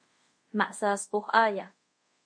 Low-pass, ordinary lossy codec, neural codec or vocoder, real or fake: 9.9 kHz; MP3, 48 kbps; codec, 24 kHz, 0.5 kbps, DualCodec; fake